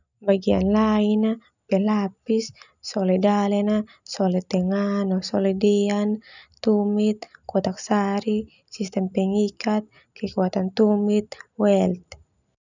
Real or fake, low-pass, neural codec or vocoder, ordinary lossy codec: real; 7.2 kHz; none; none